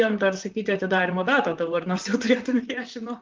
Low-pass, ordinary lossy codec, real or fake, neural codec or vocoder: 7.2 kHz; Opus, 32 kbps; fake; vocoder, 44.1 kHz, 128 mel bands, Pupu-Vocoder